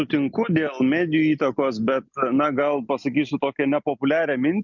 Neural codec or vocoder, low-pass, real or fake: none; 7.2 kHz; real